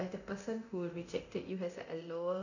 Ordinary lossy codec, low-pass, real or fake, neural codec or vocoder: none; 7.2 kHz; fake; codec, 24 kHz, 0.9 kbps, DualCodec